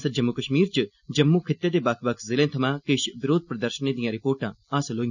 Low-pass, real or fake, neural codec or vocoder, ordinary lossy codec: 7.2 kHz; real; none; none